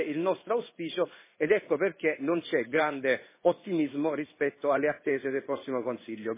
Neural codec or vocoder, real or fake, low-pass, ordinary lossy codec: codec, 16 kHz in and 24 kHz out, 1 kbps, XY-Tokenizer; fake; 3.6 kHz; MP3, 16 kbps